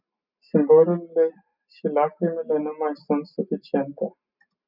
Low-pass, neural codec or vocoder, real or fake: 5.4 kHz; none; real